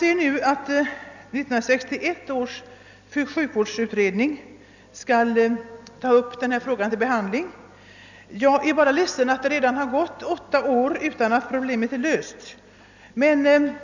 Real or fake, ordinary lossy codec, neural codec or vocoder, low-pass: real; none; none; 7.2 kHz